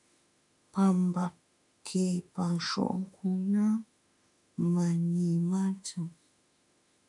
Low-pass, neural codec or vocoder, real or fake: 10.8 kHz; autoencoder, 48 kHz, 32 numbers a frame, DAC-VAE, trained on Japanese speech; fake